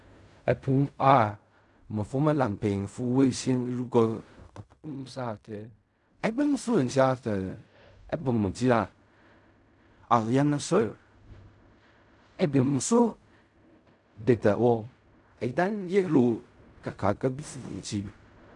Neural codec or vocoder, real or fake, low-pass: codec, 16 kHz in and 24 kHz out, 0.4 kbps, LongCat-Audio-Codec, fine tuned four codebook decoder; fake; 10.8 kHz